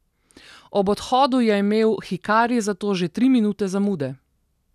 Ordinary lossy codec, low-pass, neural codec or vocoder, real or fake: none; 14.4 kHz; none; real